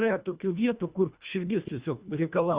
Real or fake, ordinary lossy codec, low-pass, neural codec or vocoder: fake; Opus, 64 kbps; 3.6 kHz; codec, 24 kHz, 1.5 kbps, HILCodec